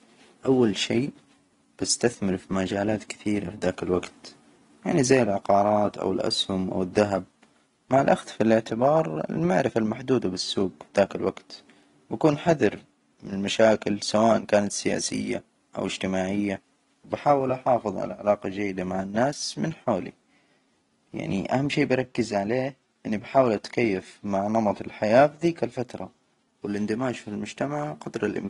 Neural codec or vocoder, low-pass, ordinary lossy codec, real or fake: none; 10.8 kHz; AAC, 32 kbps; real